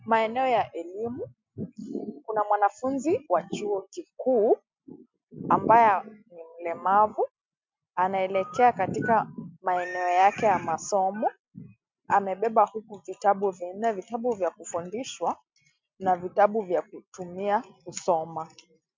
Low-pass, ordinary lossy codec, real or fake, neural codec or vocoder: 7.2 kHz; MP3, 64 kbps; real; none